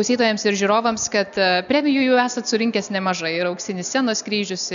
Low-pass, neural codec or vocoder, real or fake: 7.2 kHz; none; real